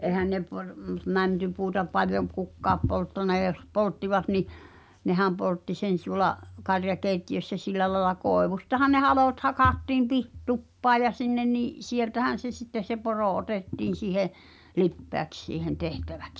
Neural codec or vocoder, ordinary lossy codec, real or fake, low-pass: none; none; real; none